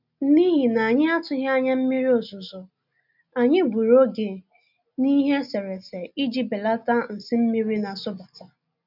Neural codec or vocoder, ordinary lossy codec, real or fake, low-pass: none; none; real; 5.4 kHz